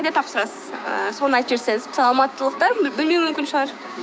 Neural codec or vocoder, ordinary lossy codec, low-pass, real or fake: codec, 16 kHz, 6 kbps, DAC; none; none; fake